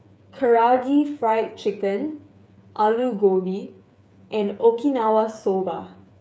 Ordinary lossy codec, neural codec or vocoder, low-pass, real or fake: none; codec, 16 kHz, 8 kbps, FreqCodec, smaller model; none; fake